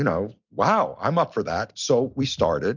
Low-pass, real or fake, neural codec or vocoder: 7.2 kHz; real; none